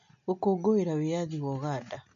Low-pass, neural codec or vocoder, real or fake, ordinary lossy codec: 7.2 kHz; none; real; MP3, 48 kbps